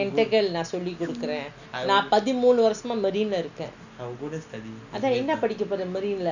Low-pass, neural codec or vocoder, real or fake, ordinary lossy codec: 7.2 kHz; none; real; none